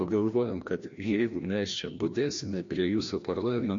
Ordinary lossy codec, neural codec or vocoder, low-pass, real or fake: MP3, 48 kbps; codec, 16 kHz, 1 kbps, FreqCodec, larger model; 7.2 kHz; fake